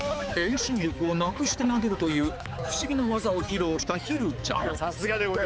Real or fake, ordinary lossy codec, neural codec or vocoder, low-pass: fake; none; codec, 16 kHz, 4 kbps, X-Codec, HuBERT features, trained on balanced general audio; none